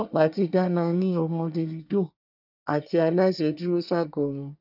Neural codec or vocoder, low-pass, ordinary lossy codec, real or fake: codec, 24 kHz, 1 kbps, SNAC; 5.4 kHz; none; fake